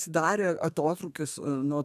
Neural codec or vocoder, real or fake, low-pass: codec, 32 kHz, 1.9 kbps, SNAC; fake; 14.4 kHz